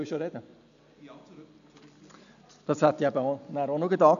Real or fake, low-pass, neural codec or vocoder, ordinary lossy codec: real; 7.2 kHz; none; none